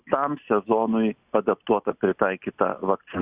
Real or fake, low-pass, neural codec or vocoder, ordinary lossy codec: real; 3.6 kHz; none; Opus, 64 kbps